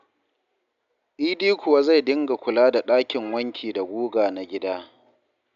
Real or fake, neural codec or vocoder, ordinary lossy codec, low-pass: real; none; AAC, 96 kbps; 7.2 kHz